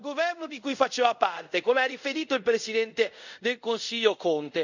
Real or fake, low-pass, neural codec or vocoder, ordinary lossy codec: fake; 7.2 kHz; codec, 24 kHz, 0.5 kbps, DualCodec; none